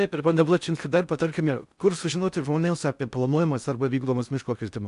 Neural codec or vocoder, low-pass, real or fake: codec, 16 kHz in and 24 kHz out, 0.6 kbps, FocalCodec, streaming, 4096 codes; 10.8 kHz; fake